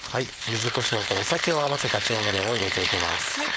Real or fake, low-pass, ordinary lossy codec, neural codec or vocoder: fake; none; none; codec, 16 kHz, 4.8 kbps, FACodec